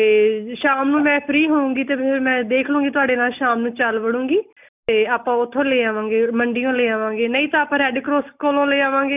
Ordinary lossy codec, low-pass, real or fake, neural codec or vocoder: none; 3.6 kHz; real; none